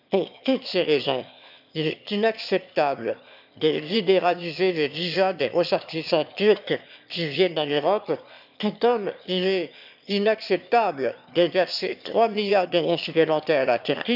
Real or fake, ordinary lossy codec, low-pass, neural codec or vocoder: fake; none; 5.4 kHz; autoencoder, 22.05 kHz, a latent of 192 numbers a frame, VITS, trained on one speaker